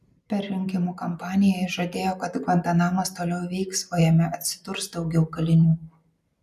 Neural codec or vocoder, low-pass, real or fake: none; 14.4 kHz; real